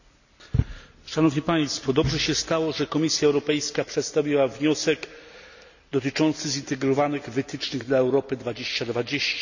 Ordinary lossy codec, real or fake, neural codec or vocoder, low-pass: none; real; none; 7.2 kHz